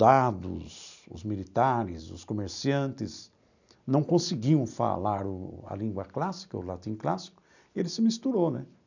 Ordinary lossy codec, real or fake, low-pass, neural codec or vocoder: none; real; 7.2 kHz; none